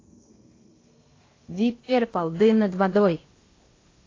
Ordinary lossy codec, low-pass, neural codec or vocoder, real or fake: AAC, 32 kbps; 7.2 kHz; codec, 16 kHz in and 24 kHz out, 0.8 kbps, FocalCodec, streaming, 65536 codes; fake